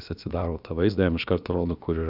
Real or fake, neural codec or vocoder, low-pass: fake; codec, 16 kHz, about 1 kbps, DyCAST, with the encoder's durations; 5.4 kHz